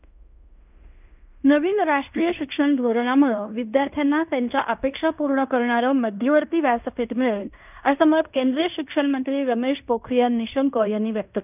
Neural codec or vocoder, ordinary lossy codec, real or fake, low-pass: codec, 16 kHz in and 24 kHz out, 0.9 kbps, LongCat-Audio-Codec, fine tuned four codebook decoder; none; fake; 3.6 kHz